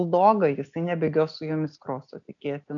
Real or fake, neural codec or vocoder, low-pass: real; none; 7.2 kHz